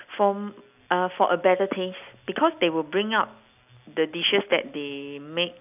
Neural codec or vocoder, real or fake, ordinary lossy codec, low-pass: none; real; none; 3.6 kHz